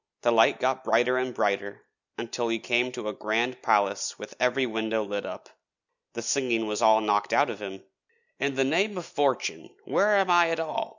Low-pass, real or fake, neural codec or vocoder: 7.2 kHz; real; none